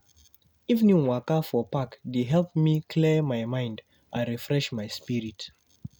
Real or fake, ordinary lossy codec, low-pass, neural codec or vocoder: real; none; none; none